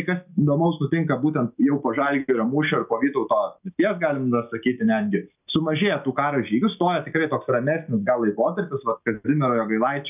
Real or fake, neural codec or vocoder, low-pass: real; none; 3.6 kHz